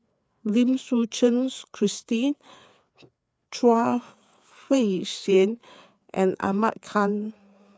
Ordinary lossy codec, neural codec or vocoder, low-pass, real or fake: none; codec, 16 kHz, 4 kbps, FreqCodec, larger model; none; fake